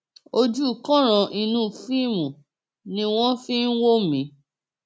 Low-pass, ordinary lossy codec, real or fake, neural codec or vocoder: none; none; real; none